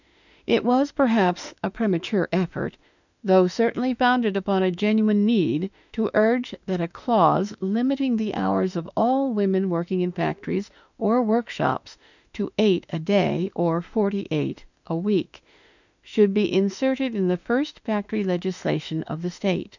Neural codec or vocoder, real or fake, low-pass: autoencoder, 48 kHz, 32 numbers a frame, DAC-VAE, trained on Japanese speech; fake; 7.2 kHz